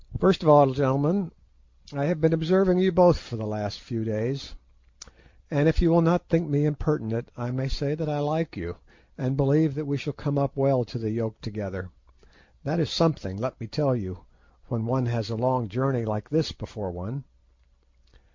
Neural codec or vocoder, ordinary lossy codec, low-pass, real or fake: none; MP3, 48 kbps; 7.2 kHz; real